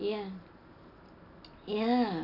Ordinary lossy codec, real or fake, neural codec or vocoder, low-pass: none; real; none; 5.4 kHz